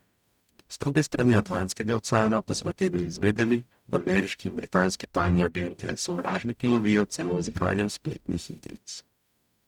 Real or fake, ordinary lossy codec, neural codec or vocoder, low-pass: fake; none; codec, 44.1 kHz, 0.9 kbps, DAC; 19.8 kHz